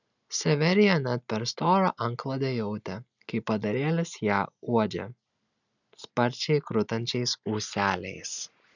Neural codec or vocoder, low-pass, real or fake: none; 7.2 kHz; real